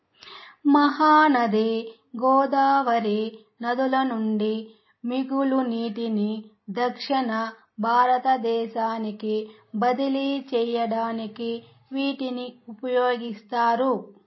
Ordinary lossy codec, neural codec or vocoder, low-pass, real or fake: MP3, 24 kbps; none; 7.2 kHz; real